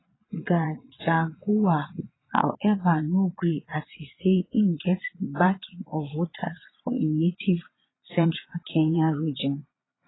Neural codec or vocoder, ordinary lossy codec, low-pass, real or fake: vocoder, 22.05 kHz, 80 mel bands, Vocos; AAC, 16 kbps; 7.2 kHz; fake